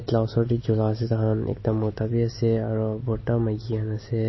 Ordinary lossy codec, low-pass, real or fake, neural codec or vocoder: MP3, 24 kbps; 7.2 kHz; real; none